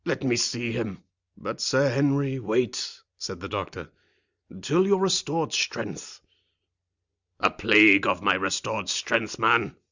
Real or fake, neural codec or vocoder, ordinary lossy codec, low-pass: real; none; Opus, 64 kbps; 7.2 kHz